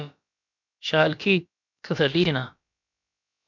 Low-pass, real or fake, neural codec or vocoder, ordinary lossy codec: 7.2 kHz; fake; codec, 16 kHz, about 1 kbps, DyCAST, with the encoder's durations; MP3, 64 kbps